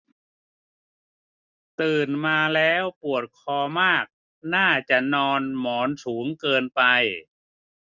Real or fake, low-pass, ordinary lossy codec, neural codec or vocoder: real; 7.2 kHz; none; none